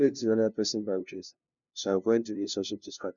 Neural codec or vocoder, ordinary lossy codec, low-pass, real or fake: codec, 16 kHz, 0.5 kbps, FunCodec, trained on LibriTTS, 25 frames a second; none; 7.2 kHz; fake